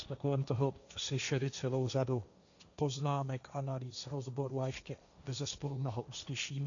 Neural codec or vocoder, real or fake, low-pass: codec, 16 kHz, 1.1 kbps, Voila-Tokenizer; fake; 7.2 kHz